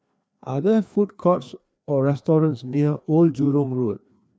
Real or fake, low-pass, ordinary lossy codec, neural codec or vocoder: fake; none; none; codec, 16 kHz, 2 kbps, FreqCodec, larger model